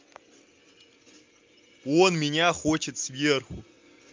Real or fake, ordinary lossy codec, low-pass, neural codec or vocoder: real; Opus, 24 kbps; 7.2 kHz; none